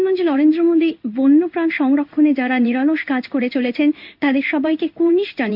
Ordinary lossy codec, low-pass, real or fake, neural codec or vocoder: none; 5.4 kHz; fake; codec, 16 kHz in and 24 kHz out, 1 kbps, XY-Tokenizer